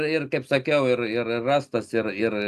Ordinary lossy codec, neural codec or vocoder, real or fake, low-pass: AAC, 96 kbps; none; real; 14.4 kHz